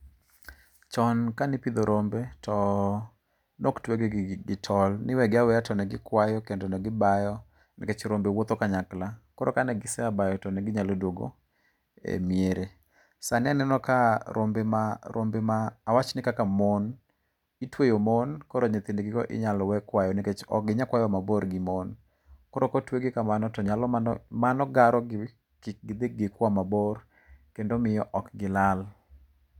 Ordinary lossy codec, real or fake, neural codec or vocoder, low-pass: none; real; none; 19.8 kHz